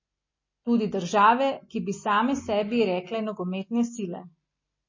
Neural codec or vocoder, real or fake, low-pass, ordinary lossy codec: none; real; 7.2 kHz; MP3, 32 kbps